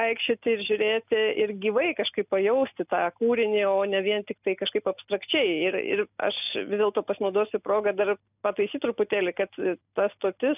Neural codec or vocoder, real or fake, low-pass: none; real; 3.6 kHz